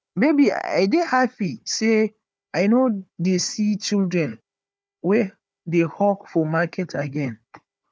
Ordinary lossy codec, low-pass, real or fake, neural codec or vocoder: none; none; fake; codec, 16 kHz, 4 kbps, FunCodec, trained on Chinese and English, 50 frames a second